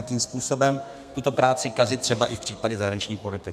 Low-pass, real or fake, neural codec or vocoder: 14.4 kHz; fake; codec, 32 kHz, 1.9 kbps, SNAC